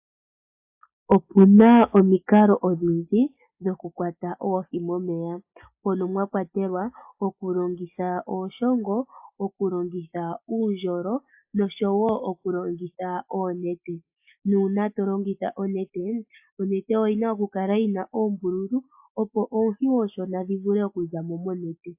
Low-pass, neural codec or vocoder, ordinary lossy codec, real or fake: 3.6 kHz; none; AAC, 32 kbps; real